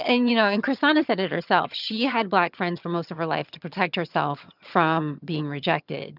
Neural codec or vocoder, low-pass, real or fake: vocoder, 22.05 kHz, 80 mel bands, HiFi-GAN; 5.4 kHz; fake